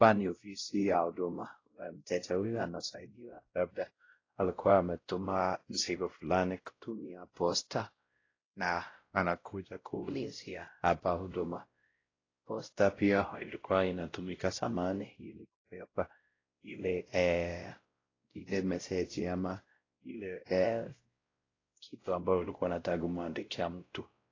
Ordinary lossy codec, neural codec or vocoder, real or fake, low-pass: AAC, 32 kbps; codec, 16 kHz, 0.5 kbps, X-Codec, WavLM features, trained on Multilingual LibriSpeech; fake; 7.2 kHz